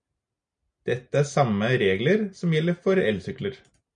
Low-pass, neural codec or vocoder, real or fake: 9.9 kHz; none; real